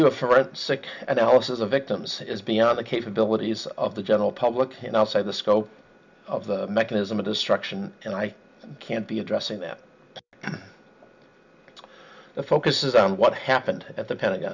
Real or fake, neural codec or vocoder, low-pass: real; none; 7.2 kHz